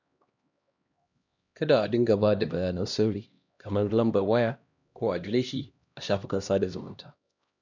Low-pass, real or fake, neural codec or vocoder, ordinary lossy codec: 7.2 kHz; fake; codec, 16 kHz, 1 kbps, X-Codec, HuBERT features, trained on LibriSpeech; none